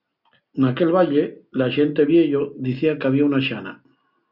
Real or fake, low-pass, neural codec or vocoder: real; 5.4 kHz; none